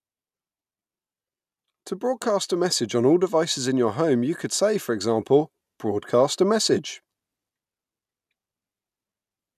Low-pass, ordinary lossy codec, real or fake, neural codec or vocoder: 14.4 kHz; none; real; none